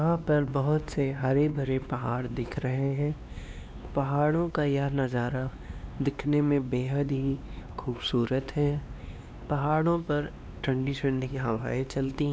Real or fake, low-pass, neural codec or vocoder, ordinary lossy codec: fake; none; codec, 16 kHz, 2 kbps, X-Codec, WavLM features, trained on Multilingual LibriSpeech; none